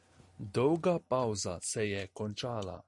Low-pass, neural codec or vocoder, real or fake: 10.8 kHz; none; real